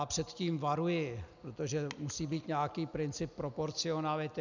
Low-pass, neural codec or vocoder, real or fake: 7.2 kHz; none; real